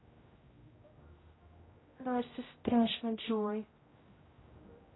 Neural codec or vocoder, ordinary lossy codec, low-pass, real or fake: codec, 16 kHz, 0.5 kbps, X-Codec, HuBERT features, trained on general audio; AAC, 16 kbps; 7.2 kHz; fake